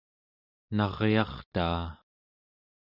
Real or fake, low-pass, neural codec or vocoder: real; 5.4 kHz; none